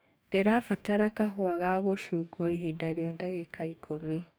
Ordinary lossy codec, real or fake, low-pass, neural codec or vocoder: none; fake; none; codec, 44.1 kHz, 2.6 kbps, DAC